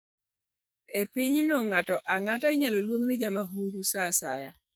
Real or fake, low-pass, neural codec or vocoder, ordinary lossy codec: fake; none; codec, 44.1 kHz, 2.6 kbps, SNAC; none